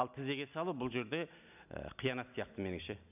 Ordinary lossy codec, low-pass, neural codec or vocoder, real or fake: none; 3.6 kHz; none; real